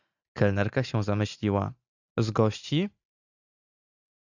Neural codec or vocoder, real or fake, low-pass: none; real; 7.2 kHz